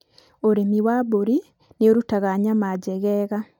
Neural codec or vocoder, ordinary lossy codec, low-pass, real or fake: none; none; 19.8 kHz; real